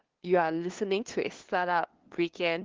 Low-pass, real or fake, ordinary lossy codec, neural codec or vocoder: 7.2 kHz; fake; Opus, 16 kbps; codec, 16 kHz, 2 kbps, FunCodec, trained on LibriTTS, 25 frames a second